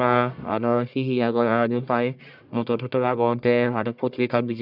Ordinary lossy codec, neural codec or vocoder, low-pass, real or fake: none; codec, 44.1 kHz, 1.7 kbps, Pupu-Codec; 5.4 kHz; fake